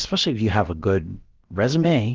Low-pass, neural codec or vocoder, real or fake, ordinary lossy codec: 7.2 kHz; codec, 16 kHz, about 1 kbps, DyCAST, with the encoder's durations; fake; Opus, 16 kbps